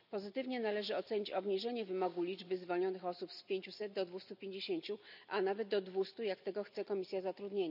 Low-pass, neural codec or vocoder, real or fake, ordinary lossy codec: 5.4 kHz; none; real; none